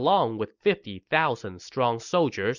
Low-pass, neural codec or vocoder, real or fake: 7.2 kHz; none; real